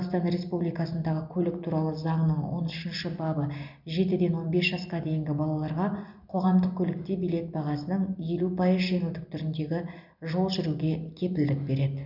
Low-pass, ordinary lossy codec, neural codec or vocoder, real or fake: 5.4 kHz; none; none; real